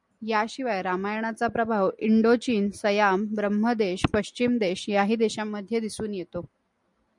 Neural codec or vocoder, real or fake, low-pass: none; real; 10.8 kHz